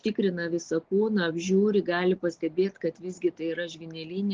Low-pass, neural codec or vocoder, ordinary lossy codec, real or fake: 7.2 kHz; none; Opus, 16 kbps; real